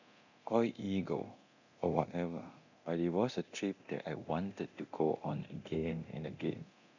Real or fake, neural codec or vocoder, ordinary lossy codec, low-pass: fake; codec, 24 kHz, 0.9 kbps, DualCodec; none; 7.2 kHz